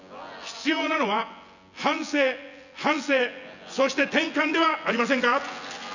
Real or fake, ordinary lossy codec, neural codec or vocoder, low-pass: fake; none; vocoder, 24 kHz, 100 mel bands, Vocos; 7.2 kHz